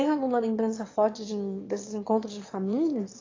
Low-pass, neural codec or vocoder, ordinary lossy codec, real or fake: 7.2 kHz; autoencoder, 22.05 kHz, a latent of 192 numbers a frame, VITS, trained on one speaker; AAC, 32 kbps; fake